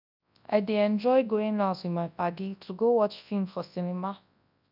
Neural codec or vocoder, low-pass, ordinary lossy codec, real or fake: codec, 24 kHz, 0.9 kbps, WavTokenizer, large speech release; 5.4 kHz; none; fake